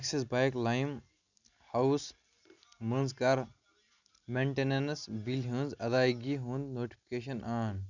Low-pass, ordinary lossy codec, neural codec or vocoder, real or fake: 7.2 kHz; none; none; real